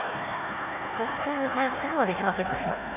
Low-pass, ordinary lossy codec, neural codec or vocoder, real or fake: 3.6 kHz; none; codec, 16 kHz, 1 kbps, FunCodec, trained on Chinese and English, 50 frames a second; fake